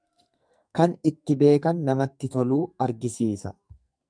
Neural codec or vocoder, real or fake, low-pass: codec, 44.1 kHz, 2.6 kbps, SNAC; fake; 9.9 kHz